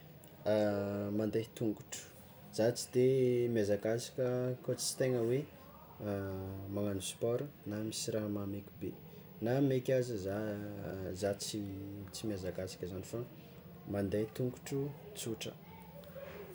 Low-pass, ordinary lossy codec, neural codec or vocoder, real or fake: none; none; none; real